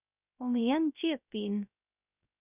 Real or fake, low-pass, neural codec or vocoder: fake; 3.6 kHz; codec, 16 kHz, 0.3 kbps, FocalCodec